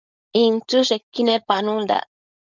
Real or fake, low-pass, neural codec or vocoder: fake; 7.2 kHz; codec, 16 kHz, 4.8 kbps, FACodec